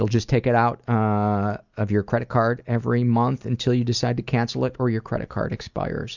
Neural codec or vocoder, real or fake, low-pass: none; real; 7.2 kHz